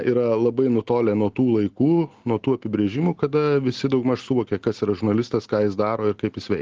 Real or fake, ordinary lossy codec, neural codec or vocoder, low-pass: real; Opus, 16 kbps; none; 7.2 kHz